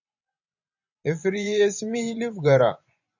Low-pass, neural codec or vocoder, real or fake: 7.2 kHz; vocoder, 24 kHz, 100 mel bands, Vocos; fake